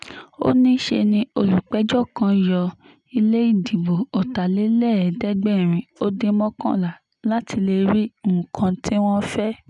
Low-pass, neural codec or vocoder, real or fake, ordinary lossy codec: 10.8 kHz; none; real; none